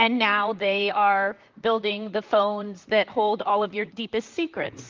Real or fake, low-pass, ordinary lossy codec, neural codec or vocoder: fake; 7.2 kHz; Opus, 24 kbps; vocoder, 44.1 kHz, 128 mel bands, Pupu-Vocoder